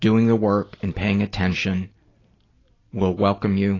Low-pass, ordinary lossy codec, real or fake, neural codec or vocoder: 7.2 kHz; AAC, 32 kbps; real; none